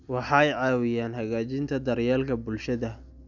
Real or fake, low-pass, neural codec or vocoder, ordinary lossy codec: real; 7.2 kHz; none; none